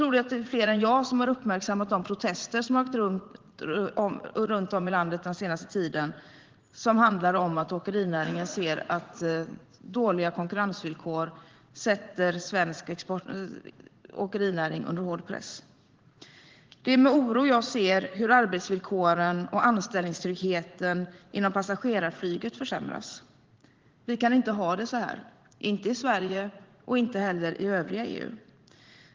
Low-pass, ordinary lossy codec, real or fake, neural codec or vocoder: 7.2 kHz; Opus, 16 kbps; fake; vocoder, 44.1 kHz, 80 mel bands, Vocos